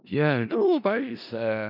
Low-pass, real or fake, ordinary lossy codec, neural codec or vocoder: 5.4 kHz; fake; none; codec, 16 kHz in and 24 kHz out, 0.4 kbps, LongCat-Audio-Codec, four codebook decoder